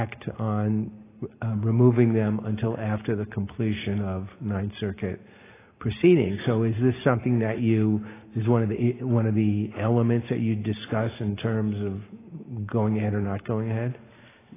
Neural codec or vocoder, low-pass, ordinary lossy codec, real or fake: none; 3.6 kHz; AAC, 16 kbps; real